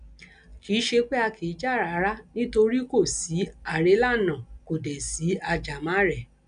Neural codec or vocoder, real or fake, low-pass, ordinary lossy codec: none; real; 9.9 kHz; none